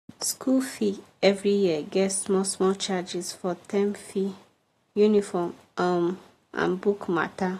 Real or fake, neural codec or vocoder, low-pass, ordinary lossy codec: real; none; 14.4 kHz; AAC, 48 kbps